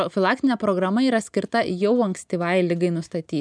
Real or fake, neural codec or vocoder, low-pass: real; none; 9.9 kHz